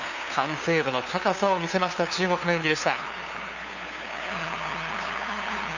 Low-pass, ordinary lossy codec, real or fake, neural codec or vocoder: 7.2 kHz; none; fake; codec, 16 kHz, 2 kbps, FunCodec, trained on LibriTTS, 25 frames a second